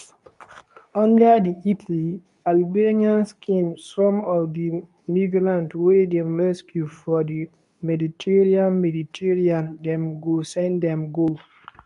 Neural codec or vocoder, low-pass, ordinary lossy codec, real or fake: codec, 24 kHz, 0.9 kbps, WavTokenizer, medium speech release version 2; 10.8 kHz; none; fake